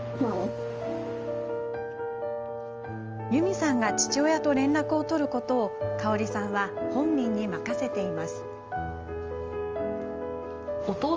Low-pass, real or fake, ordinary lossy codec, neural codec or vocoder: 7.2 kHz; real; Opus, 24 kbps; none